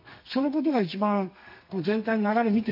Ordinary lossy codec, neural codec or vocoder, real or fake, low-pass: MP3, 32 kbps; codec, 32 kHz, 1.9 kbps, SNAC; fake; 5.4 kHz